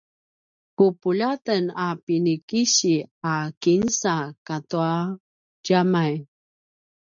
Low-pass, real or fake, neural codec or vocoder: 7.2 kHz; real; none